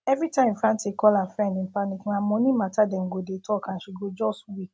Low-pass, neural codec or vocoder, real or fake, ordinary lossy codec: none; none; real; none